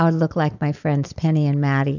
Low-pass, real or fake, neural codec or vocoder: 7.2 kHz; real; none